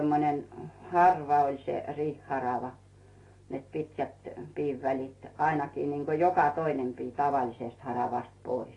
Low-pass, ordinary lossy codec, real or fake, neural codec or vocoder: 10.8 kHz; AAC, 32 kbps; real; none